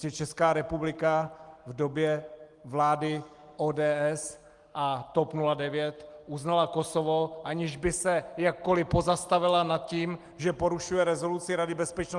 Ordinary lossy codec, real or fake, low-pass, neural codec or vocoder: Opus, 24 kbps; real; 10.8 kHz; none